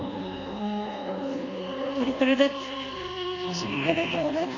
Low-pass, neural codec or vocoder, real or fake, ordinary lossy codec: 7.2 kHz; codec, 24 kHz, 1.2 kbps, DualCodec; fake; none